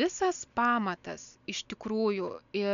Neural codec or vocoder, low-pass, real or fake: none; 7.2 kHz; real